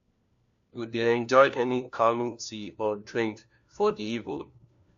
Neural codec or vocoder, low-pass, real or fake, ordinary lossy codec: codec, 16 kHz, 1 kbps, FunCodec, trained on LibriTTS, 50 frames a second; 7.2 kHz; fake; AAC, 64 kbps